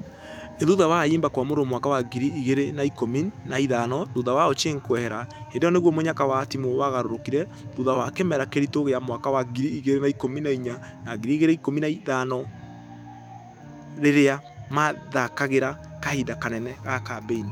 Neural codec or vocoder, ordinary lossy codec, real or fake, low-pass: autoencoder, 48 kHz, 128 numbers a frame, DAC-VAE, trained on Japanese speech; none; fake; 19.8 kHz